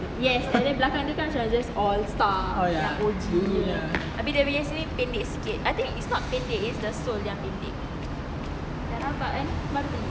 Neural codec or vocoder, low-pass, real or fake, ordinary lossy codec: none; none; real; none